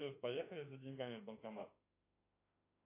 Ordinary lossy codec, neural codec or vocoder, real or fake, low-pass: AAC, 32 kbps; autoencoder, 48 kHz, 32 numbers a frame, DAC-VAE, trained on Japanese speech; fake; 3.6 kHz